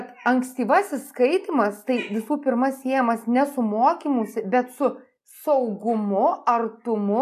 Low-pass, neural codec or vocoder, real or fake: 14.4 kHz; none; real